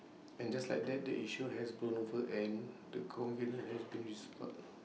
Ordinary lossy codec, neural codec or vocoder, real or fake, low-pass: none; none; real; none